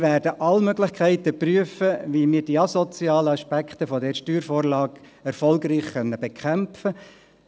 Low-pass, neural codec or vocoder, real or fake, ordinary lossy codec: none; none; real; none